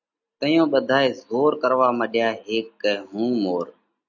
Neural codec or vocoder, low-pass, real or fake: none; 7.2 kHz; real